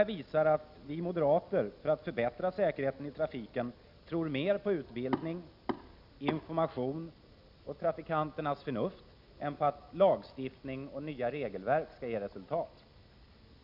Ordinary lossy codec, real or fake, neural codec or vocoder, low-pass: none; real; none; 5.4 kHz